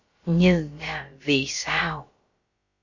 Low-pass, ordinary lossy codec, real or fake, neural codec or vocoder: 7.2 kHz; Opus, 64 kbps; fake; codec, 16 kHz, about 1 kbps, DyCAST, with the encoder's durations